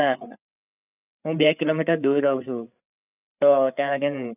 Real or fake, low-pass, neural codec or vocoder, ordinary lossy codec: fake; 3.6 kHz; codec, 16 kHz, 8 kbps, FreqCodec, larger model; none